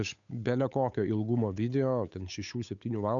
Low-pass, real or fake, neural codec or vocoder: 7.2 kHz; fake; codec, 16 kHz, 8 kbps, FunCodec, trained on Chinese and English, 25 frames a second